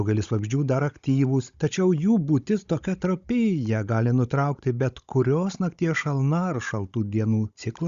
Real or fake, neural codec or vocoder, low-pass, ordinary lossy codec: fake; codec, 16 kHz, 16 kbps, FunCodec, trained on Chinese and English, 50 frames a second; 7.2 kHz; Opus, 64 kbps